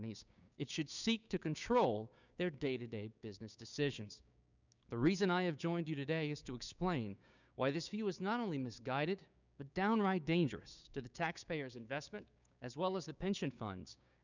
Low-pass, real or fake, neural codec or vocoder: 7.2 kHz; fake; codec, 16 kHz, 6 kbps, DAC